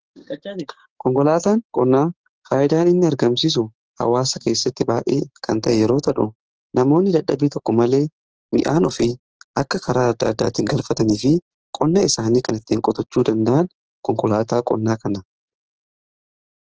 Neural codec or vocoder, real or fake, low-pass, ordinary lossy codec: codec, 16 kHz, 6 kbps, DAC; fake; 7.2 kHz; Opus, 16 kbps